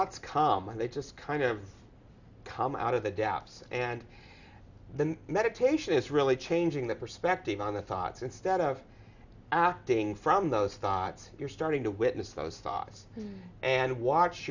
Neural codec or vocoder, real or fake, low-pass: none; real; 7.2 kHz